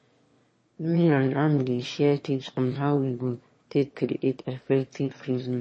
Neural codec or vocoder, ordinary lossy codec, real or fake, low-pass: autoencoder, 22.05 kHz, a latent of 192 numbers a frame, VITS, trained on one speaker; MP3, 32 kbps; fake; 9.9 kHz